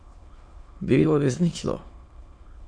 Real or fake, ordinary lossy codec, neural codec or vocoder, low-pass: fake; MP3, 48 kbps; autoencoder, 22.05 kHz, a latent of 192 numbers a frame, VITS, trained on many speakers; 9.9 kHz